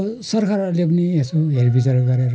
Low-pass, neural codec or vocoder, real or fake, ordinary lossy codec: none; none; real; none